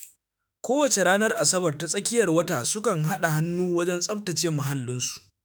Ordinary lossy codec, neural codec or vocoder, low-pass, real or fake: none; autoencoder, 48 kHz, 32 numbers a frame, DAC-VAE, trained on Japanese speech; none; fake